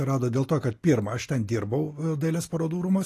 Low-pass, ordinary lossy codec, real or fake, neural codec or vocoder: 14.4 kHz; AAC, 48 kbps; real; none